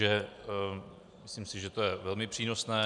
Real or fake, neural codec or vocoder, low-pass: real; none; 10.8 kHz